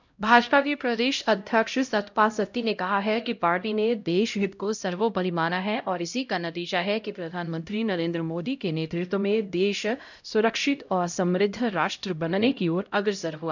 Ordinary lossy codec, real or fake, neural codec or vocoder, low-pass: none; fake; codec, 16 kHz, 0.5 kbps, X-Codec, HuBERT features, trained on LibriSpeech; 7.2 kHz